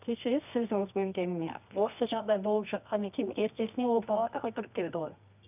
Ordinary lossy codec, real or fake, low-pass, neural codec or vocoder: none; fake; 3.6 kHz; codec, 24 kHz, 0.9 kbps, WavTokenizer, medium music audio release